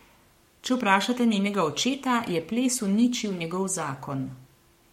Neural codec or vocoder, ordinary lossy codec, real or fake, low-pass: codec, 44.1 kHz, 7.8 kbps, Pupu-Codec; MP3, 64 kbps; fake; 19.8 kHz